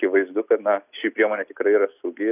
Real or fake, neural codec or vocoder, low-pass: real; none; 3.6 kHz